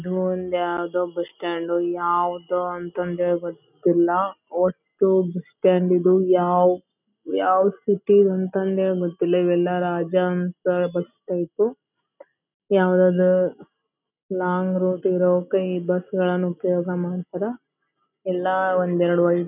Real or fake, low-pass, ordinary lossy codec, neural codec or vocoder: real; 3.6 kHz; none; none